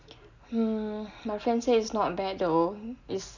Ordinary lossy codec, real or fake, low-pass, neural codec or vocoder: none; real; 7.2 kHz; none